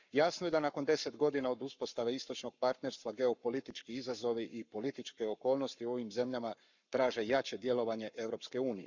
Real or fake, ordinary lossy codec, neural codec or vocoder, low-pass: fake; none; codec, 44.1 kHz, 7.8 kbps, Pupu-Codec; 7.2 kHz